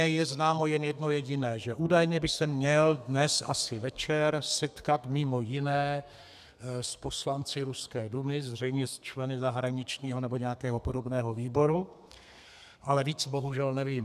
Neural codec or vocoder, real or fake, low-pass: codec, 32 kHz, 1.9 kbps, SNAC; fake; 14.4 kHz